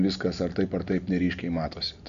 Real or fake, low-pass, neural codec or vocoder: real; 7.2 kHz; none